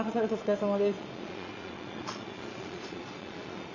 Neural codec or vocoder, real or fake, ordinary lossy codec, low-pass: vocoder, 22.05 kHz, 80 mel bands, Vocos; fake; Opus, 64 kbps; 7.2 kHz